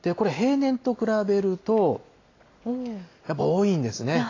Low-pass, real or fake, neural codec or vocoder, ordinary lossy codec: 7.2 kHz; real; none; AAC, 32 kbps